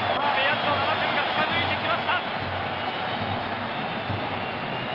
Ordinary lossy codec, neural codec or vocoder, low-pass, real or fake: Opus, 24 kbps; none; 5.4 kHz; real